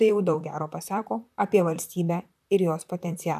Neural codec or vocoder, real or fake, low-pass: vocoder, 44.1 kHz, 128 mel bands, Pupu-Vocoder; fake; 14.4 kHz